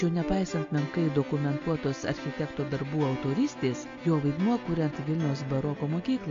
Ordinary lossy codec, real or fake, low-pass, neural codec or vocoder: AAC, 48 kbps; real; 7.2 kHz; none